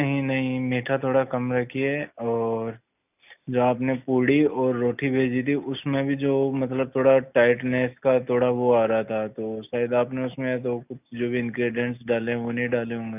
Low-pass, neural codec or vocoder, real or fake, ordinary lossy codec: 3.6 kHz; none; real; none